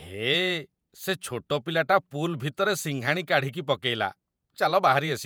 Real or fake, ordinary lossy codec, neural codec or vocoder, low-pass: fake; none; vocoder, 48 kHz, 128 mel bands, Vocos; none